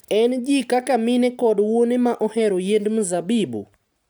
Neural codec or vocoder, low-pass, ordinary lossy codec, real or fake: none; none; none; real